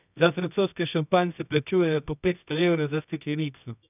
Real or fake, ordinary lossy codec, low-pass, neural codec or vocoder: fake; none; 3.6 kHz; codec, 24 kHz, 0.9 kbps, WavTokenizer, medium music audio release